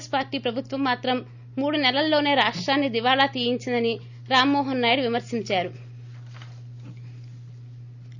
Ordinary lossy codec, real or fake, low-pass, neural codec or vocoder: none; real; 7.2 kHz; none